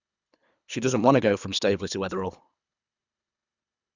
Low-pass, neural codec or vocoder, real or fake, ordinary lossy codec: 7.2 kHz; codec, 24 kHz, 3 kbps, HILCodec; fake; none